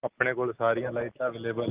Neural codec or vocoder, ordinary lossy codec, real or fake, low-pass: none; Opus, 32 kbps; real; 3.6 kHz